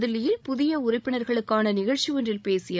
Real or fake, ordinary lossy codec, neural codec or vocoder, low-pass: fake; none; codec, 16 kHz, 16 kbps, FreqCodec, larger model; none